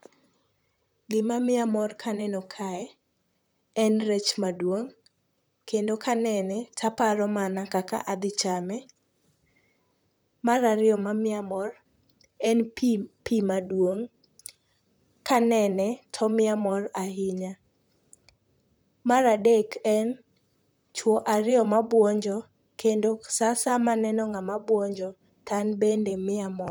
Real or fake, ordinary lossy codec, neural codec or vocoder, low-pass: fake; none; vocoder, 44.1 kHz, 128 mel bands, Pupu-Vocoder; none